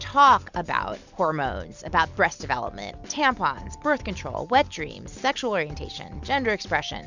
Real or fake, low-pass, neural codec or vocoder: real; 7.2 kHz; none